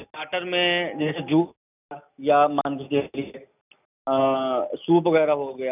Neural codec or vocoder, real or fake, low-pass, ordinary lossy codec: none; real; 3.6 kHz; none